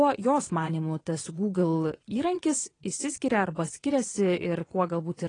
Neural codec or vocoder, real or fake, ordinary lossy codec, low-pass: vocoder, 22.05 kHz, 80 mel bands, WaveNeXt; fake; AAC, 32 kbps; 9.9 kHz